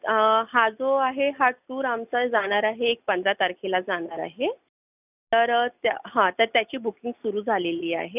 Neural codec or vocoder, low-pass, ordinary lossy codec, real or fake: none; 3.6 kHz; none; real